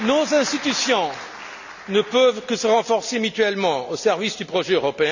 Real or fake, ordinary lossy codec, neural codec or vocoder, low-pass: real; none; none; 7.2 kHz